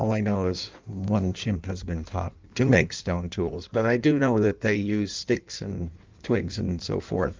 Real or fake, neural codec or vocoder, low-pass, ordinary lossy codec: fake; codec, 16 kHz in and 24 kHz out, 1.1 kbps, FireRedTTS-2 codec; 7.2 kHz; Opus, 24 kbps